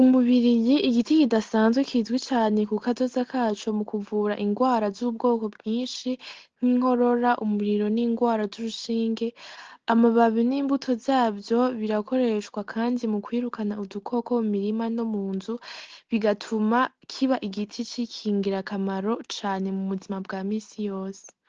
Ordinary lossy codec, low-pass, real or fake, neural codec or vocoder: Opus, 16 kbps; 7.2 kHz; real; none